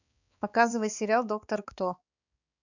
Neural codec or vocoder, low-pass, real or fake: codec, 16 kHz, 4 kbps, X-Codec, HuBERT features, trained on balanced general audio; 7.2 kHz; fake